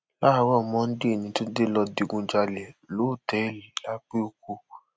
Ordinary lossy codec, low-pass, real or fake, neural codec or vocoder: none; none; real; none